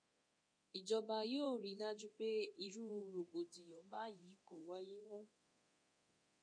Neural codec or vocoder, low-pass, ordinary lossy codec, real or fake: codec, 24 kHz, 0.9 kbps, DualCodec; 9.9 kHz; MP3, 48 kbps; fake